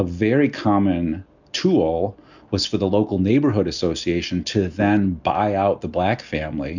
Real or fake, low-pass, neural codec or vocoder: real; 7.2 kHz; none